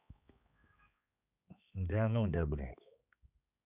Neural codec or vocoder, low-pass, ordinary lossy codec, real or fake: codec, 16 kHz, 4 kbps, X-Codec, HuBERT features, trained on balanced general audio; 3.6 kHz; none; fake